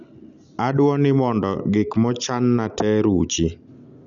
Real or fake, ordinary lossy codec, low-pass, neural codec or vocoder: real; none; 7.2 kHz; none